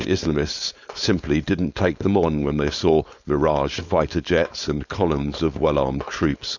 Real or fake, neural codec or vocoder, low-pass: fake; codec, 16 kHz, 4.8 kbps, FACodec; 7.2 kHz